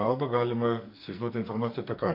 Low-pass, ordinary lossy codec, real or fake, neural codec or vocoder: 5.4 kHz; MP3, 48 kbps; fake; codec, 44.1 kHz, 2.6 kbps, SNAC